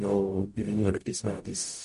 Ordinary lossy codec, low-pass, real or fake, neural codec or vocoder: MP3, 48 kbps; 14.4 kHz; fake; codec, 44.1 kHz, 0.9 kbps, DAC